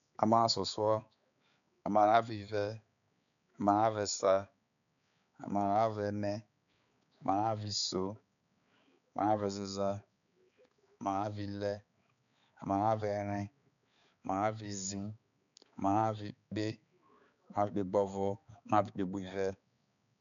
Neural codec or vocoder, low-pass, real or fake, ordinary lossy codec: codec, 16 kHz, 4 kbps, X-Codec, HuBERT features, trained on balanced general audio; 7.2 kHz; fake; none